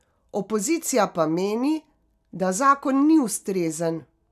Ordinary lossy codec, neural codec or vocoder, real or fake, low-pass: none; none; real; 14.4 kHz